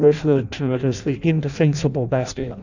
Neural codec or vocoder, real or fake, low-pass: codec, 16 kHz in and 24 kHz out, 0.6 kbps, FireRedTTS-2 codec; fake; 7.2 kHz